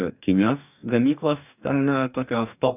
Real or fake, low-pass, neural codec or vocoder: fake; 3.6 kHz; codec, 44.1 kHz, 2.6 kbps, DAC